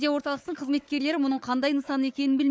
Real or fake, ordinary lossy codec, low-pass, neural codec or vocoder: fake; none; none; codec, 16 kHz, 4 kbps, FunCodec, trained on Chinese and English, 50 frames a second